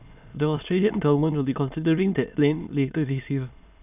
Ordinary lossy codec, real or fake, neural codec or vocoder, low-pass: none; fake; autoencoder, 22.05 kHz, a latent of 192 numbers a frame, VITS, trained on many speakers; 3.6 kHz